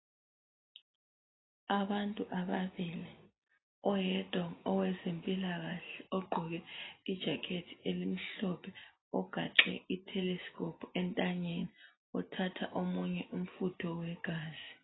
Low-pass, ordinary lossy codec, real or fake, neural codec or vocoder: 7.2 kHz; AAC, 16 kbps; real; none